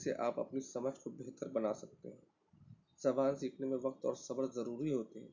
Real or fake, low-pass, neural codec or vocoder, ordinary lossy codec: real; 7.2 kHz; none; MP3, 64 kbps